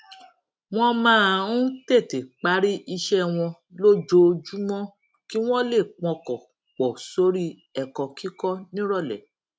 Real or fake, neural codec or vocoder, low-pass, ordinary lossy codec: real; none; none; none